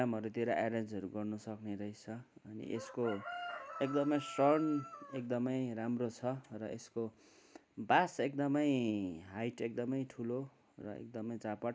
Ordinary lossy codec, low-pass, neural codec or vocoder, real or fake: none; none; none; real